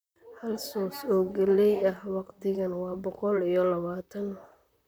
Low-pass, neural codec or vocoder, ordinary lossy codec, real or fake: none; vocoder, 44.1 kHz, 128 mel bands, Pupu-Vocoder; none; fake